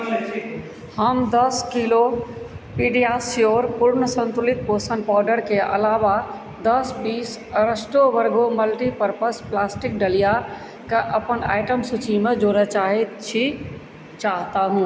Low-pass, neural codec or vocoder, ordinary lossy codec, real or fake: none; none; none; real